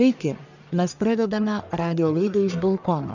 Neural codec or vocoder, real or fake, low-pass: codec, 44.1 kHz, 1.7 kbps, Pupu-Codec; fake; 7.2 kHz